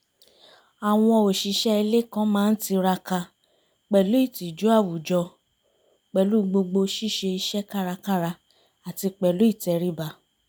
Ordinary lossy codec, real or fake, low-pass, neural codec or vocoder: none; real; none; none